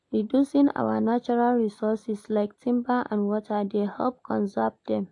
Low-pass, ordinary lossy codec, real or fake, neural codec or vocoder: 10.8 kHz; AAC, 64 kbps; real; none